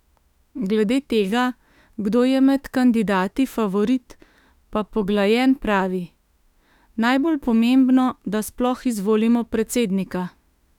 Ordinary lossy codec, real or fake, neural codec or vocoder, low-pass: none; fake; autoencoder, 48 kHz, 32 numbers a frame, DAC-VAE, trained on Japanese speech; 19.8 kHz